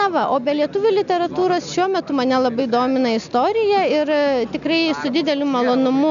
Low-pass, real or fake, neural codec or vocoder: 7.2 kHz; real; none